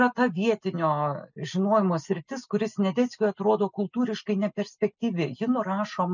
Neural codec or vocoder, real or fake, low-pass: none; real; 7.2 kHz